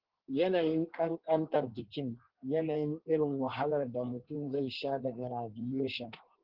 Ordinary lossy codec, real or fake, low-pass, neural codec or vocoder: Opus, 16 kbps; fake; 5.4 kHz; codec, 16 kHz in and 24 kHz out, 1.1 kbps, FireRedTTS-2 codec